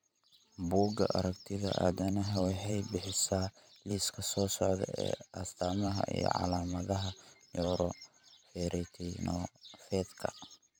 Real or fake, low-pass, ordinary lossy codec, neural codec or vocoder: fake; none; none; vocoder, 44.1 kHz, 128 mel bands every 512 samples, BigVGAN v2